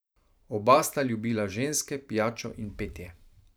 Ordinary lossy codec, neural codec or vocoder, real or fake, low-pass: none; vocoder, 44.1 kHz, 128 mel bands every 512 samples, BigVGAN v2; fake; none